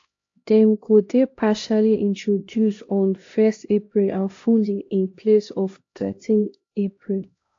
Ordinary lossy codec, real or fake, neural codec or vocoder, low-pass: AAC, 48 kbps; fake; codec, 16 kHz, 1 kbps, X-Codec, HuBERT features, trained on LibriSpeech; 7.2 kHz